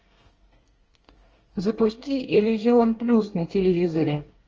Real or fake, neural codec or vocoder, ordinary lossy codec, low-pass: fake; codec, 24 kHz, 1 kbps, SNAC; Opus, 24 kbps; 7.2 kHz